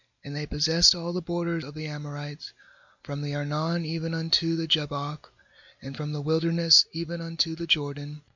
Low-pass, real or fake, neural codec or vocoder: 7.2 kHz; real; none